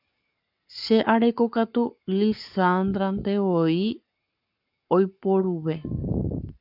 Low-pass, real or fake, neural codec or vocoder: 5.4 kHz; fake; codec, 44.1 kHz, 7.8 kbps, Pupu-Codec